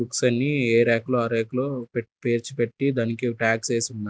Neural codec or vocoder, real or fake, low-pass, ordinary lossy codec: none; real; none; none